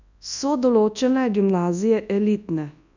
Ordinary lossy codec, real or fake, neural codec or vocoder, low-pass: none; fake; codec, 24 kHz, 0.9 kbps, WavTokenizer, large speech release; 7.2 kHz